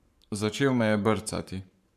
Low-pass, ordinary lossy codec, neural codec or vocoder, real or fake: 14.4 kHz; none; codec, 44.1 kHz, 7.8 kbps, Pupu-Codec; fake